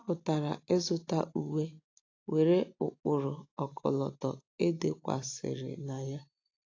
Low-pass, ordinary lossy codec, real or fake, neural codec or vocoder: 7.2 kHz; AAC, 32 kbps; real; none